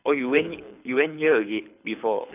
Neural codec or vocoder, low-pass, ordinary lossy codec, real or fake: codec, 24 kHz, 6 kbps, HILCodec; 3.6 kHz; none; fake